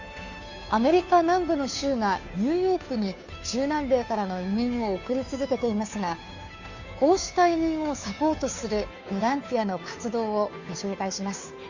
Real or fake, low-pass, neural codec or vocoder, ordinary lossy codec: fake; 7.2 kHz; codec, 16 kHz, 2 kbps, FunCodec, trained on Chinese and English, 25 frames a second; Opus, 64 kbps